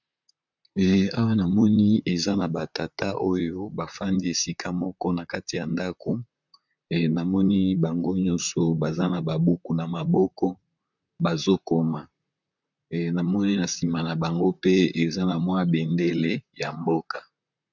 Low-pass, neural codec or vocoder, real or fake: 7.2 kHz; vocoder, 44.1 kHz, 128 mel bands, Pupu-Vocoder; fake